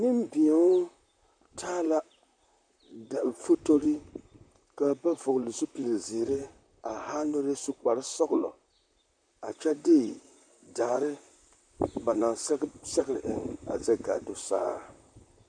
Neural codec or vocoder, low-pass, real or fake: vocoder, 44.1 kHz, 128 mel bands, Pupu-Vocoder; 9.9 kHz; fake